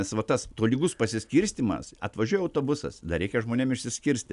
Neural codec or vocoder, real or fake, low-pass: none; real; 10.8 kHz